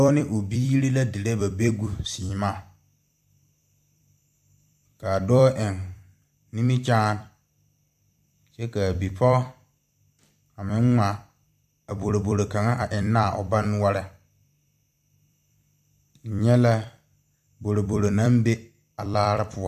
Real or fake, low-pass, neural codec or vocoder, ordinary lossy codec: fake; 14.4 kHz; vocoder, 44.1 kHz, 128 mel bands every 256 samples, BigVGAN v2; AAC, 96 kbps